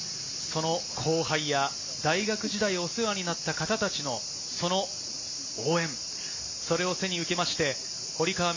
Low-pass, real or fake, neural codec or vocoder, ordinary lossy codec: 7.2 kHz; real; none; MP3, 48 kbps